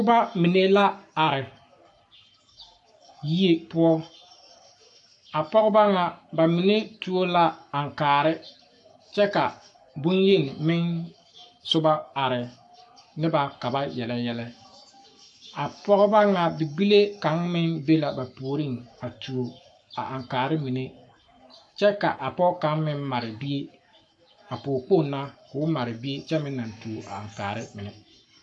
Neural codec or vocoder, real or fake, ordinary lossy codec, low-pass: codec, 44.1 kHz, 7.8 kbps, Pupu-Codec; fake; AAC, 64 kbps; 10.8 kHz